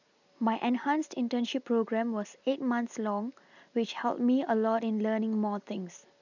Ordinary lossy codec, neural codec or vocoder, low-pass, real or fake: none; none; 7.2 kHz; real